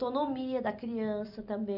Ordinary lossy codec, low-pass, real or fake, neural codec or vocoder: none; 5.4 kHz; real; none